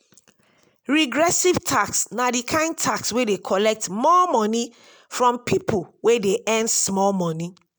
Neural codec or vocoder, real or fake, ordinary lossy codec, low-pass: none; real; none; none